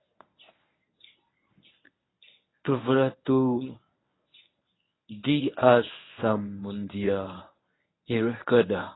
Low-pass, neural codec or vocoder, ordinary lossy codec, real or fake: 7.2 kHz; codec, 24 kHz, 0.9 kbps, WavTokenizer, medium speech release version 1; AAC, 16 kbps; fake